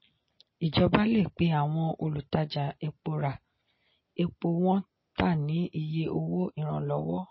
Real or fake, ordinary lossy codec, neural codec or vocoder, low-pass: real; MP3, 24 kbps; none; 7.2 kHz